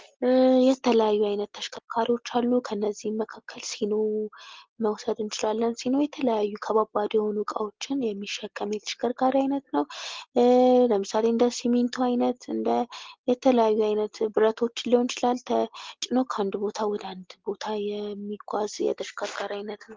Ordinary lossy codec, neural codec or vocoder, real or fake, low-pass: Opus, 16 kbps; none; real; 7.2 kHz